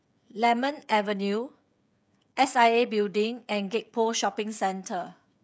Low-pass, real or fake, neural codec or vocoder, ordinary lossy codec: none; fake; codec, 16 kHz, 16 kbps, FreqCodec, smaller model; none